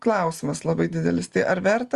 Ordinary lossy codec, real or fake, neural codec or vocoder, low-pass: Opus, 32 kbps; real; none; 10.8 kHz